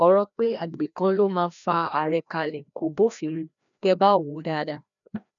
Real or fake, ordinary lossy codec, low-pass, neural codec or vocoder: fake; none; 7.2 kHz; codec, 16 kHz, 1 kbps, FreqCodec, larger model